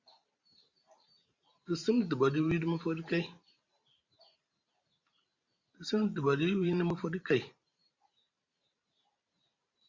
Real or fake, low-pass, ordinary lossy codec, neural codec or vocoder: fake; 7.2 kHz; Opus, 64 kbps; vocoder, 44.1 kHz, 128 mel bands every 512 samples, BigVGAN v2